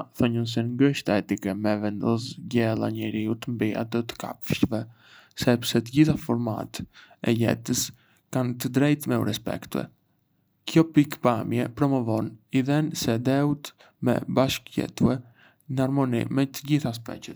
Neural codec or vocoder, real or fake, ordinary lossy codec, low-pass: none; real; none; none